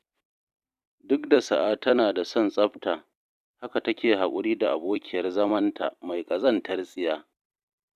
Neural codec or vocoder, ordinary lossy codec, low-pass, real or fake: vocoder, 44.1 kHz, 128 mel bands every 256 samples, BigVGAN v2; none; 14.4 kHz; fake